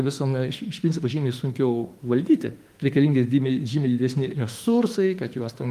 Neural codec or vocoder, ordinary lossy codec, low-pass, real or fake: autoencoder, 48 kHz, 32 numbers a frame, DAC-VAE, trained on Japanese speech; Opus, 32 kbps; 14.4 kHz; fake